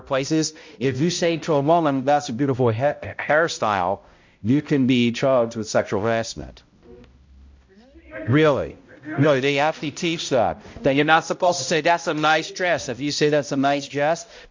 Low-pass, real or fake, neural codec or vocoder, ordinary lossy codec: 7.2 kHz; fake; codec, 16 kHz, 0.5 kbps, X-Codec, HuBERT features, trained on balanced general audio; MP3, 48 kbps